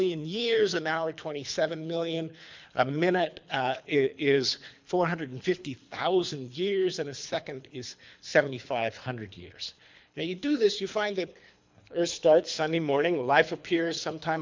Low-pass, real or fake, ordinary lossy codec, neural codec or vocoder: 7.2 kHz; fake; MP3, 64 kbps; codec, 24 kHz, 3 kbps, HILCodec